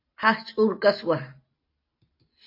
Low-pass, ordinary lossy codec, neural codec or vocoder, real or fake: 5.4 kHz; AAC, 32 kbps; none; real